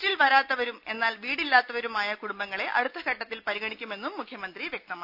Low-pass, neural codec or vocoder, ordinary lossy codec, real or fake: 5.4 kHz; none; none; real